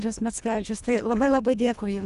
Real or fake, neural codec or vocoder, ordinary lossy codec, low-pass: fake; codec, 24 kHz, 1.5 kbps, HILCodec; Opus, 64 kbps; 10.8 kHz